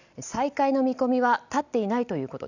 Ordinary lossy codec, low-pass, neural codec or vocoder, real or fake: none; 7.2 kHz; none; real